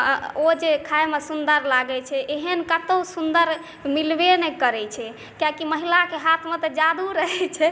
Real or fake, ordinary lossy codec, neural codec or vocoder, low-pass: real; none; none; none